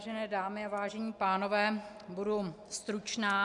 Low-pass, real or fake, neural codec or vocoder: 10.8 kHz; real; none